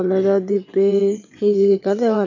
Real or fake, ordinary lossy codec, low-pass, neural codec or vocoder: fake; none; 7.2 kHz; vocoder, 22.05 kHz, 80 mel bands, WaveNeXt